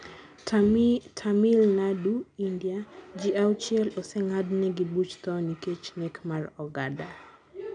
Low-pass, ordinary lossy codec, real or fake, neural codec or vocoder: 9.9 kHz; none; real; none